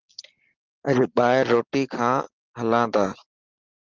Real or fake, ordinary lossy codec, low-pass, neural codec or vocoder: real; Opus, 16 kbps; 7.2 kHz; none